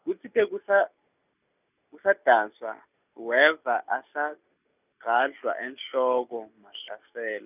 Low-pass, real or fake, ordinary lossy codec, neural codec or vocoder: 3.6 kHz; real; none; none